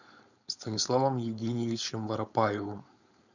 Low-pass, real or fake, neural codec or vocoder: 7.2 kHz; fake; codec, 16 kHz, 4.8 kbps, FACodec